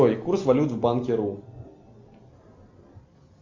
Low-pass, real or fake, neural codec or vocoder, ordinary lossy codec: 7.2 kHz; real; none; MP3, 64 kbps